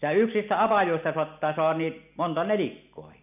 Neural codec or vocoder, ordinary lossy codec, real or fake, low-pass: none; none; real; 3.6 kHz